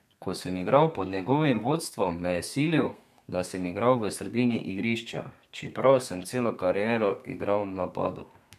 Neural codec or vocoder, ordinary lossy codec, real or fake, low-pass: codec, 32 kHz, 1.9 kbps, SNAC; none; fake; 14.4 kHz